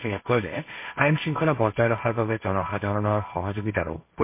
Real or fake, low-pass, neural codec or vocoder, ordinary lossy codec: fake; 3.6 kHz; codec, 16 kHz, 1.1 kbps, Voila-Tokenizer; MP3, 24 kbps